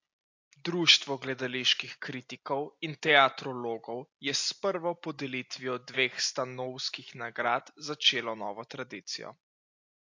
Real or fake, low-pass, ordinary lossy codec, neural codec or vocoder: real; 7.2 kHz; AAC, 48 kbps; none